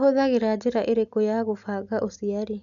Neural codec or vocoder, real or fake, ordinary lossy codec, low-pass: none; real; none; 7.2 kHz